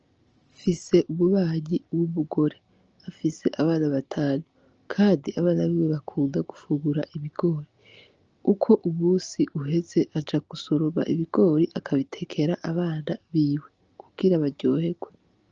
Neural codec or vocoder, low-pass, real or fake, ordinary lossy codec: none; 7.2 kHz; real; Opus, 24 kbps